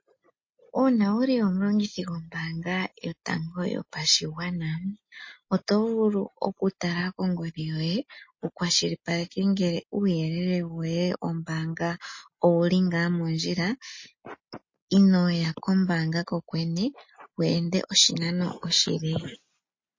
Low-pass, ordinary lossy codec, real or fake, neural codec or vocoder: 7.2 kHz; MP3, 32 kbps; real; none